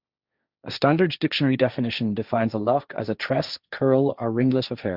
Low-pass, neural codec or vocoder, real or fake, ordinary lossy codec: 5.4 kHz; codec, 16 kHz, 1.1 kbps, Voila-Tokenizer; fake; Opus, 64 kbps